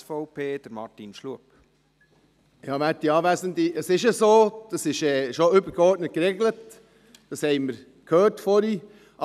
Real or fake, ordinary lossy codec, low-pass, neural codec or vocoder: real; none; 14.4 kHz; none